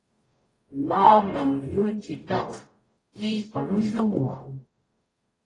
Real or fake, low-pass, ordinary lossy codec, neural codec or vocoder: fake; 10.8 kHz; AAC, 32 kbps; codec, 44.1 kHz, 0.9 kbps, DAC